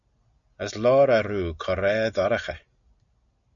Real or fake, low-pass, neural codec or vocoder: real; 7.2 kHz; none